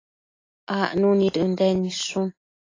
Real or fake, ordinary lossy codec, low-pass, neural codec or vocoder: real; AAC, 32 kbps; 7.2 kHz; none